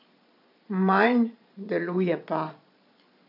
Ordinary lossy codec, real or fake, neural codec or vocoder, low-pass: none; fake; vocoder, 22.05 kHz, 80 mel bands, Vocos; 5.4 kHz